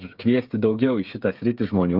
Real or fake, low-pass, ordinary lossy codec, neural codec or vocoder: fake; 5.4 kHz; Opus, 24 kbps; codec, 16 kHz, 8 kbps, FreqCodec, smaller model